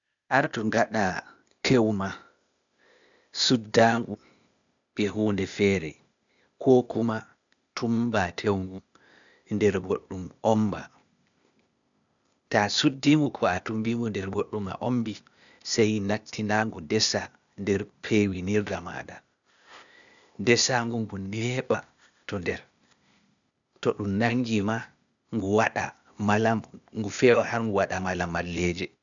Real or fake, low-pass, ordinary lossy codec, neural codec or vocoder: fake; 7.2 kHz; none; codec, 16 kHz, 0.8 kbps, ZipCodec